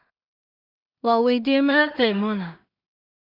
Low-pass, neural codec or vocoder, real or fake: 5.4 kHz; codec, 16 kHz in and 24 kHz out, 0.4 kbps, LongCat-Audio-Codec, two codebook decoder; fake